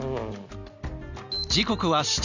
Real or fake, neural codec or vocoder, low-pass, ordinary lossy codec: real; none; 7.2 kHz; none